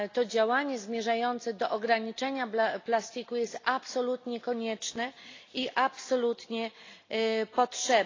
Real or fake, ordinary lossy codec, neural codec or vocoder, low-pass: real; AAC, 32 kbps; none; 7.2 kHz